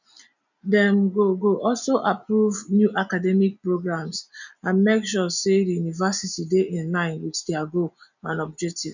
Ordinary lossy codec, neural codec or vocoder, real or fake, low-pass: none; none; real; 7.2 kHz